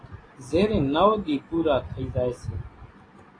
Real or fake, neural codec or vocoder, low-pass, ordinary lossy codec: real; none; 9.9 kHz; AAC, 32 kbps